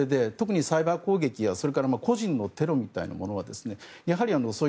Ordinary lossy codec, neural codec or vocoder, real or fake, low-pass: none; none; real; none